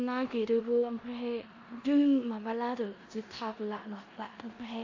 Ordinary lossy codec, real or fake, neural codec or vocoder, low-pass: AAC, 48 kbps; fake; codec, 16 kHz in and 24 kHz out, 0.9 kbps, LongCat-Audio-Codec, four codebook decoder; 7.2 kHz